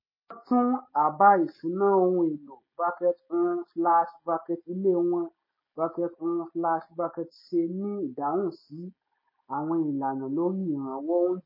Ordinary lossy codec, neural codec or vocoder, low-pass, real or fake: MP3, 24 kbps; none; 5.4 kHz; real